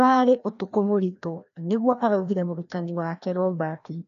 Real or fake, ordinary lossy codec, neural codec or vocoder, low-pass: fake; none; codec, 16 kHz, 1 kbps, FreqCodec, larger model; 7.2 kHz